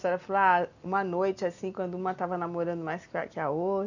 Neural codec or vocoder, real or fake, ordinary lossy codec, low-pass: none; real; none; 7.2 kHz